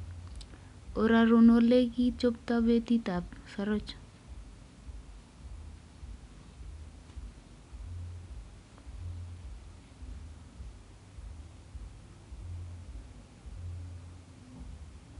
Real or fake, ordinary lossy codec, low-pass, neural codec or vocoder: real; none; 10.8 kHz; none